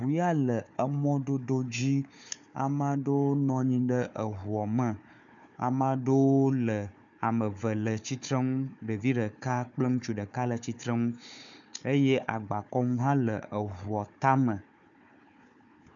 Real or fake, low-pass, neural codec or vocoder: fake; 7.2 kHz; codec, 16 kHz, 4 kbps, FunCodec, trained on Chinese and English, 50 frames a second